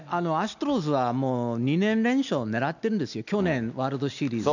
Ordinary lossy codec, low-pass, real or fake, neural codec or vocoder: none; 7.2 kHz; real; none